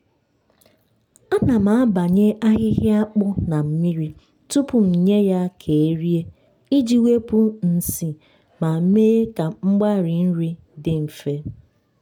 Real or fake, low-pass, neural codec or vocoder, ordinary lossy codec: real; 19.8 kHz; none; none